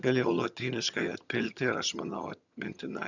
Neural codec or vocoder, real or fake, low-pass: vocoder, 22.05 kHz, 80 mel bands, HiFi-GAN; fake; 7.2 kHz